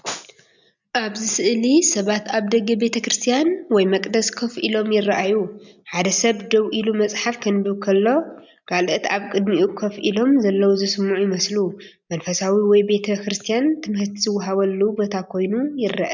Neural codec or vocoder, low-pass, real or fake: none; 7.2 kHz; real